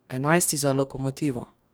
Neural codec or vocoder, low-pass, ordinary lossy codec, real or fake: codec, 44.1 kHz, 2.6 kbps, DAC; none; none; fake